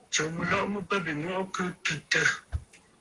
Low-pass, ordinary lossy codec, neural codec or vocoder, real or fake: 10.8 kHz; Opus, 24 kbps; codec, 44.1 kHz, 7.8 kbps, Pupu-Codec; fake